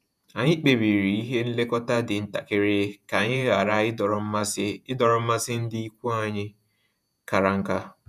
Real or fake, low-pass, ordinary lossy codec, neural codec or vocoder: fake; 14.4 kHz; none; vocoder, 48 kHz, 128 mel bands, Vocos